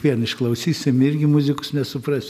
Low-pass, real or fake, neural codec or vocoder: 14.4 kHz; real; none